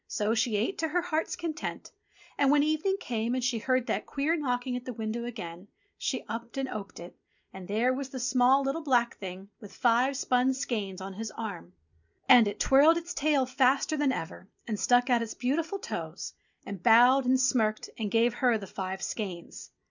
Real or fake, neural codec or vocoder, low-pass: real; none; 7.2 kHz